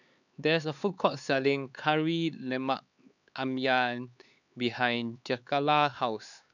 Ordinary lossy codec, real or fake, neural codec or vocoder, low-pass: none; fake; codec, 16 kHz, 4 kbps, X-Codec, HuBERT features, trained on LibriSpeech; 7.2 kHz